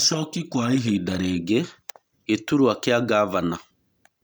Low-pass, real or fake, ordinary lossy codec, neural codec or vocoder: none; real; none; none